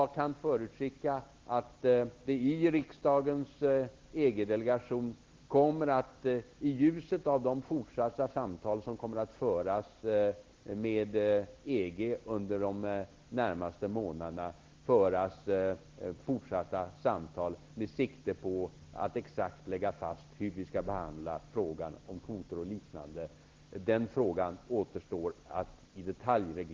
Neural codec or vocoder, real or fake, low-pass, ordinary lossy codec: none; real; 7.2 kHz; Opus, 16 kbps